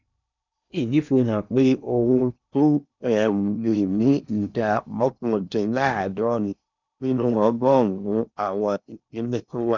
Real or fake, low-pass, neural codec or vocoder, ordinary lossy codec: fake; 7.2 kHz; codec, 16 kHz in and 24 kHz out, 0.8 kbps, FocalCodec, streaming, 65536 codes; none